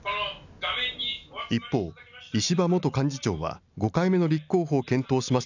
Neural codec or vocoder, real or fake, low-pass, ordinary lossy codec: none; real; 7.2 kHz; none